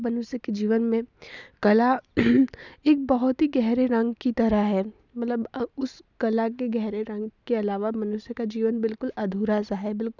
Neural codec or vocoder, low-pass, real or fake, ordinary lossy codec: none; 7.2 kHz; real; none